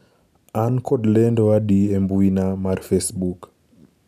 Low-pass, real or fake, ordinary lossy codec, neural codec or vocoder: 14.4 kHz; real; none; none